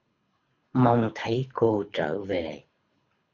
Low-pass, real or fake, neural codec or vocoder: 7.2 kHz; fake; codec, 24 kHz, 3 kbps, HILCodec